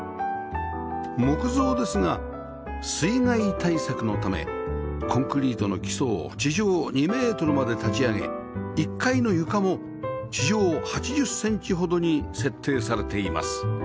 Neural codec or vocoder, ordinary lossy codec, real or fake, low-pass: none; none; real; none